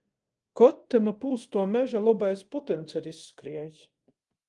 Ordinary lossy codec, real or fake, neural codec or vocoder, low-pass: Opus, 24 kbps; fake; codec, 24 kHz, 0.5 kbps, DualCodec; 10.8 kHz